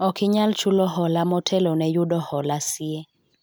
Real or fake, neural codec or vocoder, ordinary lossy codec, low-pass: real; none; none; none